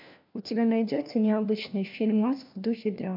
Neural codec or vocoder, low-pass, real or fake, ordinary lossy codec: codec, 16 kHz, 1 kbps, FunCodec, trained on LibriTTS, 50 frames a second; 5.4 kHz; fake; MP3, 48 kbps